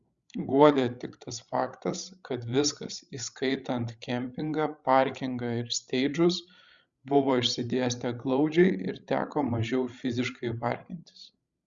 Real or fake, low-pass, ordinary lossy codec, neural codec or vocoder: fake; 7.2 kHz; Opus, 64 kbps; codec, 16 kHz, 16 kbps, FreqCodec, larger model